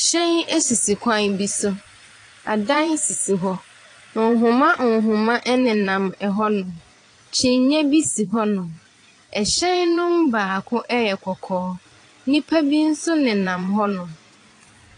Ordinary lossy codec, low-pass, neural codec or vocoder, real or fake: AAC, 48 kbps; 9.9 kHz; vocoder, 22.05 kHz, 80 mel bands, Vocos; fake